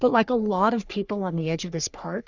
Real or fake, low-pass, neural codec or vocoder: fake; 7.2 kHz; codec, 44.1 kHz, 3.4 kbps, Pupu-Codec